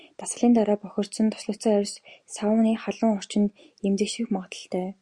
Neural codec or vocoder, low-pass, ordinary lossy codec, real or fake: none; 10.8 kHz; Opus, 64 kbps; real